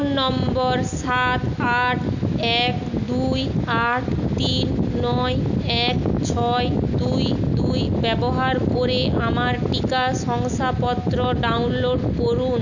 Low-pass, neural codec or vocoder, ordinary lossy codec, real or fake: 7.2 kHz; none; none; real